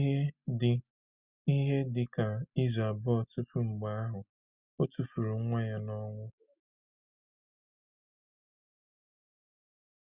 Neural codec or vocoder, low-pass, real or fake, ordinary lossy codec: none; 3.6 kHz; real; Opus, 64 kbps